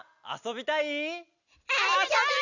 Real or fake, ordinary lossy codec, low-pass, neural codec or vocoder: real; none; 7.2 kHz; none